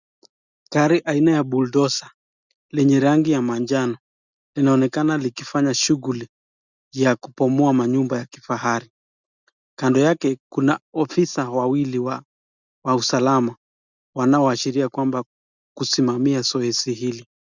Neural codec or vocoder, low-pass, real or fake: none; 7.2 kHz; real